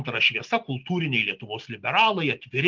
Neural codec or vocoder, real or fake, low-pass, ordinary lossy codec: none; real; 7.2 kHz; Opus, 32 kbps